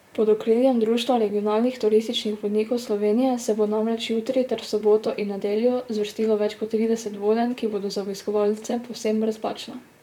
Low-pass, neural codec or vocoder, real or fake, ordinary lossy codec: 19.8 kHz; vocoder, 44.1 kHz, 128 mel bands, Pupu-Vocoder; fake; none